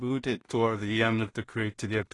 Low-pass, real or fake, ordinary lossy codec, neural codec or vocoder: 10.8 kHz; fake; AAC, 32 kbps; codec, 16 kHz in and 24 kHz out, 0.4 kbps, LongCat-Audio-Codec, two codebook decoder